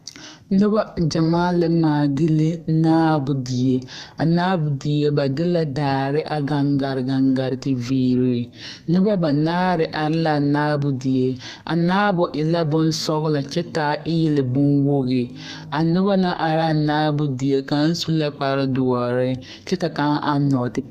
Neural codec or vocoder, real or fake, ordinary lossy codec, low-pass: codec, 32 kHz, 1.9 kbps, SNAC; fake; Opus, 64 kbps; 14.4 kHz